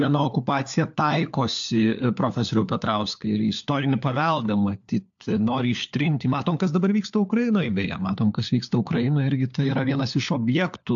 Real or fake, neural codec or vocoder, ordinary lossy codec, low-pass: fake; codec, 16 kHz, 4 kbps, FunCodec, trained on LibriTTS, 50 frames a second; AAC, 64 kbps; 7.2 kHz